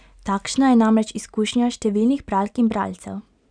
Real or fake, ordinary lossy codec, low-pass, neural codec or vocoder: real; none; 9.9 kHz; none